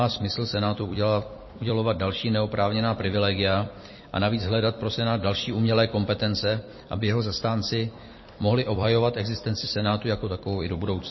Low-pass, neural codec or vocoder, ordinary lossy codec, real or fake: 7.2 kHz; vocoder, 44.1 kHz, 128 mel bands every 256 samples, BigVGAN v2; MP3, 24 kbps; fake